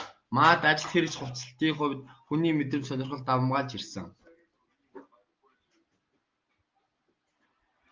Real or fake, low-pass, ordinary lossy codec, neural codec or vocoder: real; 7.2 kHz; Opus, 32 kbps; none